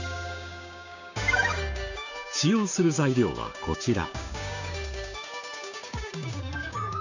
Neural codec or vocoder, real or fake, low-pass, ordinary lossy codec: codec, 16 kHz, 6 kbps, DAC; fake; 7.2 kHz; none